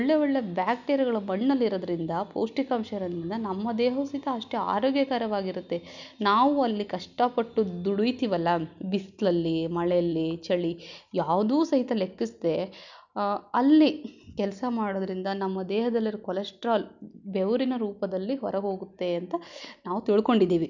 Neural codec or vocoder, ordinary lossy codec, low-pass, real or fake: none; none; 7.2 kHz; real